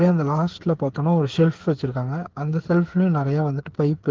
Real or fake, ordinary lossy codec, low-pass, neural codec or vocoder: fake; Opus, 16 kbps; 7.2 kHz; codec, 16 kHz, 8 kbps, FreqCodec, smaller model